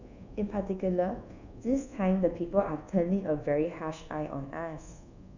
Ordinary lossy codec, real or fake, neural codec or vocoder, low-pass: none; fake; codec, 24 kHz, 1.2 kbps, DualCodec; 7.2 kHz